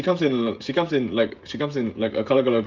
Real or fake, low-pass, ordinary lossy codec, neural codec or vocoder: fake; 7.2 kHz; Opus, 24 kbps; codec, 16 kHz, 16 kbps, FreqCodec, smaller model